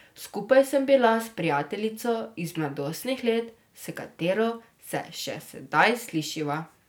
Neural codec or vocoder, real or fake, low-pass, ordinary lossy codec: none; real; none; none